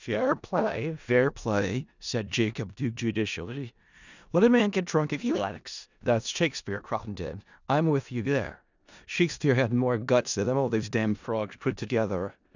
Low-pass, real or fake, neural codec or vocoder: 7.2 kHz; fake; codec, 16 kHz in and 24 kHz out, 0.4 kbps, LongCat-Audio-Codec, four codebook decoder